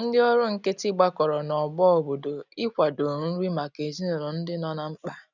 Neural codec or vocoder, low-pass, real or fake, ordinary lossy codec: none; 7.2 kHz; real; none